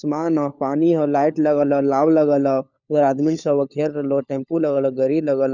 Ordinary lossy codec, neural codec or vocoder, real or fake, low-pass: none; codec, 16 kHz, 4 kbps, FunCodec, trained on LibriTTS, 50 frames a second; fake; 7.2 kHz